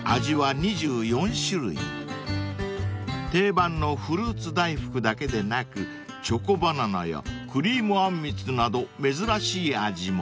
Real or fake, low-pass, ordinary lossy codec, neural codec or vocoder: real; none; none; none